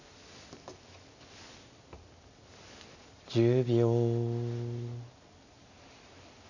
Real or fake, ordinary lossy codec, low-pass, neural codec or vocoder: real; none; 7.2 kHz; none